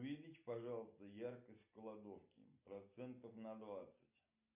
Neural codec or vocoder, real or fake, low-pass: none; real; 3.6 kHz